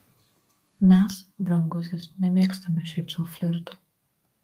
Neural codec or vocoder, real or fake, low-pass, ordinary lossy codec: codec, 32 kHz, 1.9 kbps, SNAC; fake; 14.4 kHz; Opus, 32 kbps